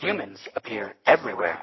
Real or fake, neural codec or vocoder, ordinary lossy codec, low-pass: real; none; MP3, 24 kbps; 7.2 kHz